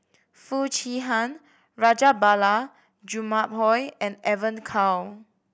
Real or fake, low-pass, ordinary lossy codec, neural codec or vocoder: real; none; none; none